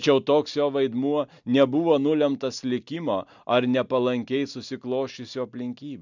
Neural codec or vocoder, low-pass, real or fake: none; 7.2 kHz; real